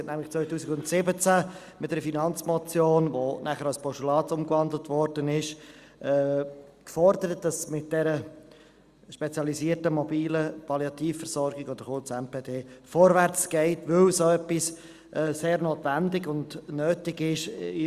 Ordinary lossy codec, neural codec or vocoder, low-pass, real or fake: Opus, 64 kbps; none; 14.4 kHz; real